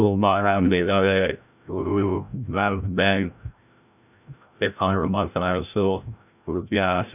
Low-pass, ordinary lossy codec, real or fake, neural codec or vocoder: 3.6 kHz; none; fake; codec, 16 kHz, 0.5 kbps, FreqCodec, larger model